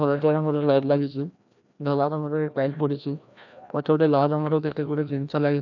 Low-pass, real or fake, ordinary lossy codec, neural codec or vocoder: 7.2 kHz; fake; none; codec, 16 kHz, 1 kbps, FreqCodec, larger model